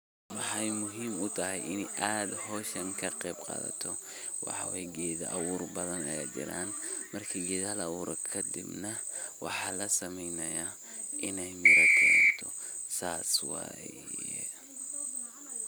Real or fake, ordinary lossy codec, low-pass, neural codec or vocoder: real; none; none; none